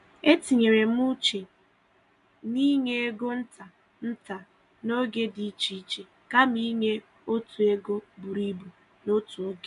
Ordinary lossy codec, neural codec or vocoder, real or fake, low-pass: MP3, 96 kbps; none; real; 10.8 kHz